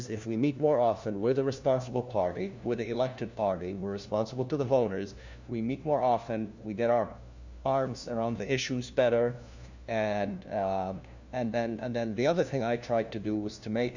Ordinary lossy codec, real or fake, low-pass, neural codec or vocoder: Opus, 64 kbps; fake; 7.2 kHz; codec, 16 kHz, 1 kbps, FunCodec, trained on LibriTTS, 50 frames a second